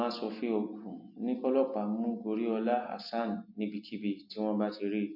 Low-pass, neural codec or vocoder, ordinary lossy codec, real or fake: 5.4 kHz; none; MP3, 32 kbps; real